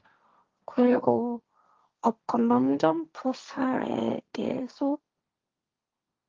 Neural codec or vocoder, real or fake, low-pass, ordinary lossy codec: codec, 16 kHz, 1.1 kbps, Voila-Tokenizer; fake; 7.2 kHz; Opus, 24 kbps